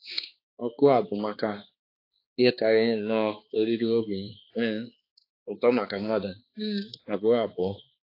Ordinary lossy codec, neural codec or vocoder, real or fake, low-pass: AAC, 32 kbps; codec, 16 kHz, 2 kbps, X-Codec, HuBERT features, trained on balanced general audio; fake; 5.4 kHz